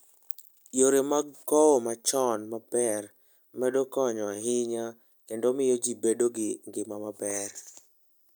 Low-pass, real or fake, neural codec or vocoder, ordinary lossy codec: none; real; none; none